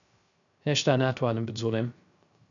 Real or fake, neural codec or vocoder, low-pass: fake; codec, 16 kHz, 0.3 kbps, FocalCodec; 7.2 kHz